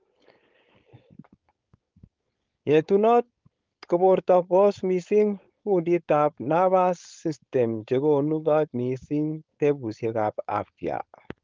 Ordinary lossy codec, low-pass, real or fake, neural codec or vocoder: Opus, 32 kbps; 7.2 kHz; fake; codec, 16 kHz, 4.8 kbps, FACodec